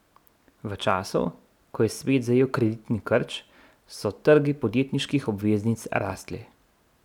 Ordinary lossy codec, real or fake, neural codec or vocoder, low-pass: none; real; none; 19.8 kHz